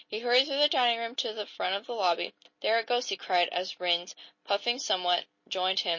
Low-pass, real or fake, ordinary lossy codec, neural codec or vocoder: 7.2 kHz; real; MP3, 32 kbps; none